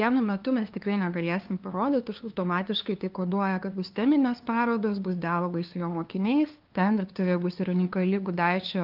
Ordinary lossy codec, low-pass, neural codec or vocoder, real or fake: Opus, 32 kbps; 5.4 kHz; codec, 16 kHz, 2 kbps, FunCodec, trained on LibriTTS, 25 frames a second; fake